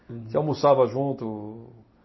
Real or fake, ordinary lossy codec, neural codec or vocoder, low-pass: real; MP3, 24 kbps; none; 7.2 kHz